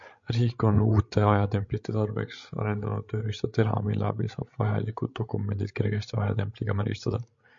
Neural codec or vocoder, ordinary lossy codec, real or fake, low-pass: codec, 16 kHz, 16 kbps, FreqCodec, larger model; MP3, 48 kbps; fake; 7.2 kHz